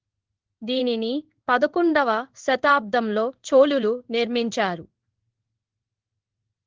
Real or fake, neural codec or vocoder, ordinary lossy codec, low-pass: fake; codec, 16 kHz in and 24 kHz out, 1 kbps, XY-Tokenizer; Opus, 16 kbps; 7.2 kHz